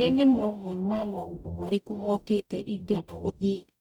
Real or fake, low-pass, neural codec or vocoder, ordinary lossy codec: fake; 19.8 kHz; codec, 44.1 kHz, 0.9 kbps, DAC; none